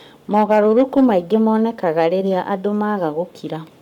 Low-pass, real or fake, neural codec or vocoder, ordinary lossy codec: 19.8 kHz; fake; codec, 44.1 kHz, 7.8 kbps, Pupu-Codec; none